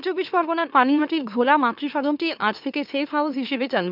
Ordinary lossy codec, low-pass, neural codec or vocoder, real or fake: none; 5.4 kHz; autoencoder, 44.1 kHz, a latent of 192 numbers a frame, MeloTTS; fake